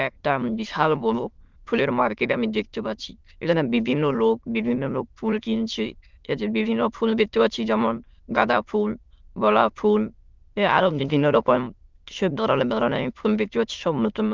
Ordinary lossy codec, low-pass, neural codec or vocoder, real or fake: Opus, 32 kbps; 7.2 kHz; autoencoder, 22.05 kHz, a latent of 192 numbers a frame, VITS, trained on many speakers; fake